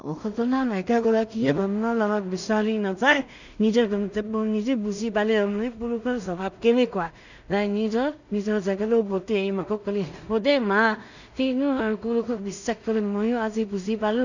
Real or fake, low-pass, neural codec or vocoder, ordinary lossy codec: fake; 7.2 kHz; codec, 16 kHz in and 24 kHz out, 0.4 kbps, LongCat-Audio-Codec, two codebook decoder; none